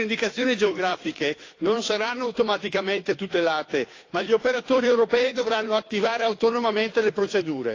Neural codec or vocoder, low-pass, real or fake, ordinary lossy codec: codec, 16 kHz, 2 kbps, FunCodec, trained on Chinese and English, 25 frames a second; 7.2 kHz; fake; AAC, 32 kbps